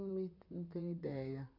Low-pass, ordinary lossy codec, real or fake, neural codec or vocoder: 5.4 kHz; none; fake; vocoder, 44.1 kHz, 128 mel bands, Pupu-Vocoder